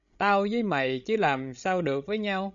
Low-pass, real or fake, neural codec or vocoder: 7.2 kHz; fake; codec, 16 kHz, 16 kbps, FreqCodec, larger model